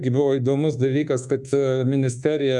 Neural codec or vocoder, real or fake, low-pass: codec, 24 kHz, 1.2 kbps, DualCodec; fake; 10.8 kHz